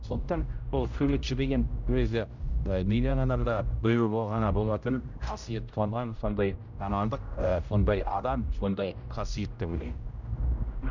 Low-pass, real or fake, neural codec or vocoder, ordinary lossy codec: 7.2 kHz; fake; codec, 16 kHz, 0.5 kbps, X-Codec, HuBERT features, trained on general audio; none